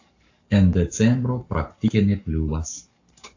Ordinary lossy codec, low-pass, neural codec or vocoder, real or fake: AAC, 48 kbps; 7.2 kHz; codec, 44.1 kHz, 7.8 kbps, Pupu-Codec; fake